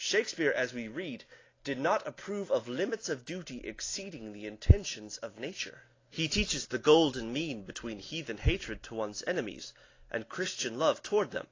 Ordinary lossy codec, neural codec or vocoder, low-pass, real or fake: AAC, 32 kbps; none; 7.2 kHz; real